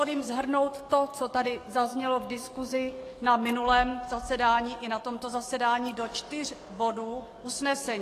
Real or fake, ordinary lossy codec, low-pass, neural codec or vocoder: fake; AAC, 48 kbps; 14.4 kHz; codec, 44.1 kHz, 7.8 kbps, DAC